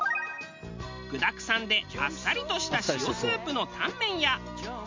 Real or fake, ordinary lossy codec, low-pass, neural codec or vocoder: real; MP3, 64 kbps; 7.2 kHz; none